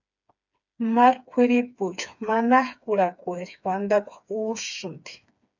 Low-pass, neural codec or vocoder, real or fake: 7.2 kHz; codec, 16 kHz, 4 kbps, FreqCodec, smaller model; fake